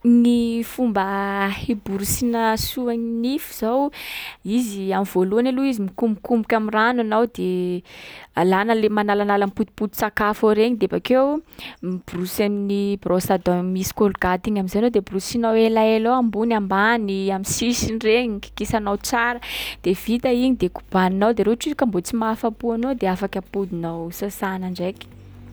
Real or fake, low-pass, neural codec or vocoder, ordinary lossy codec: real; none; none; none